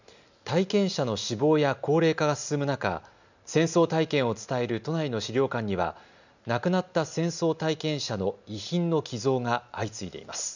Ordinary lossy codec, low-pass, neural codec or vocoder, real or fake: none; 7.2 kHz; none; real